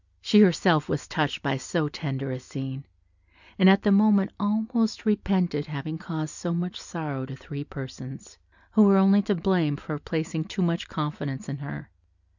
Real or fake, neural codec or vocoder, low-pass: real; none; 7.2 kHz